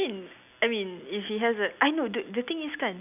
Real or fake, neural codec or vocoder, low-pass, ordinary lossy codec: real; none; 3.6 kHz; none